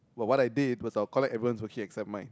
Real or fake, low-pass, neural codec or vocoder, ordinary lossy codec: real; none; none; none